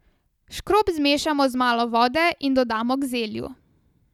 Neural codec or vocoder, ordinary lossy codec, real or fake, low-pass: none; none; real; 19.8 kHz